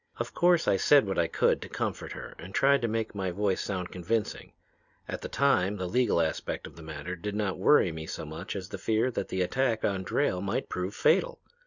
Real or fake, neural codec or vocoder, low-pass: real; none; 7.2 kHz